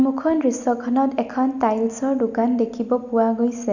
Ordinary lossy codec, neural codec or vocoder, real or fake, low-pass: none; none; real; 7.2 kHz